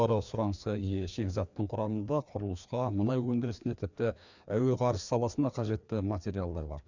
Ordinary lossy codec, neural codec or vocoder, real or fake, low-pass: none; codec, 16 kHz, 2 kbps, FreqCodec, larger model; fake; 7.2 kHz